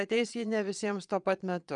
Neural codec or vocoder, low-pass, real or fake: vocoder, 22.05 kHz, 80 mel bands, Vocos; 9.9 kHz; fake